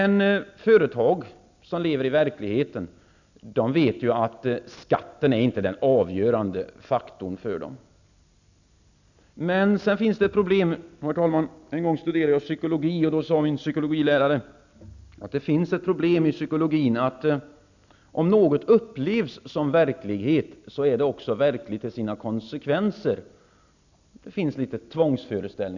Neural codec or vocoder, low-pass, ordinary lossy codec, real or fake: none; 7.2 kHz; none; real